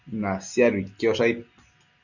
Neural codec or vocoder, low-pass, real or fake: none; 7.2 kHz; real